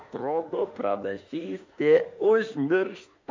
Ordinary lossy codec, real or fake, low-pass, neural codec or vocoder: MP3, 48 kbps; fake; 7.2 kHz; autoencoder, 48 kHz, 32 numbers a frame, DAC-VAE, trained on Japanese speech